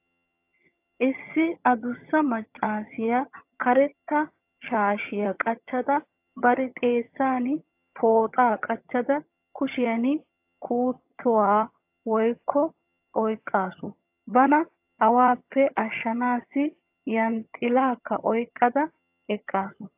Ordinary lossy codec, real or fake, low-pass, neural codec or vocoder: AAC, 32 kbps; fake; 3.6 kHz; vocoder, 22.05 kHz, 80 mel bands, HiFi-GAN